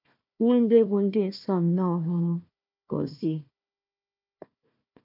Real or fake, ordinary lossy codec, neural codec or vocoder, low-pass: fake; none; codec, 16 kHz, 1 kbps, FunCodec, trained on Chinese and English, 50 frames a second; 5.4 kHz